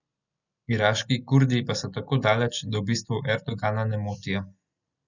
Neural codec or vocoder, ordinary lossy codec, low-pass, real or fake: none; none; 7.2 kHz; real